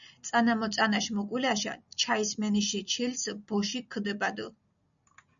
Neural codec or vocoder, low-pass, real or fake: none; 7.2 kHz; real